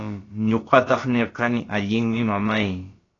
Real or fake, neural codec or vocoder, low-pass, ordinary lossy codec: fake; codec, 16 kHz, about 1 kbps, DyCAST, with the encoder's durations; 7.2 kHz; AAC, 32 kbps